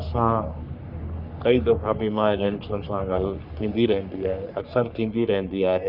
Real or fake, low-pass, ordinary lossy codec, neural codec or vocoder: fake; 5.4 kHz; none; codec, 44.1 kHz, 3.4 kbps, Pupu-Codec